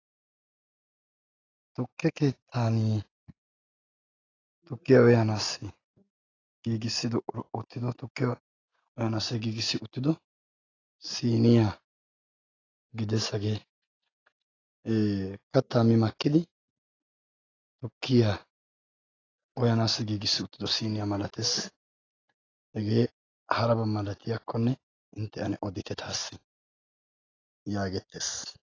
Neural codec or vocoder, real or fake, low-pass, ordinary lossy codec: none; real; 7.2 kHz; AAC, 32 kbps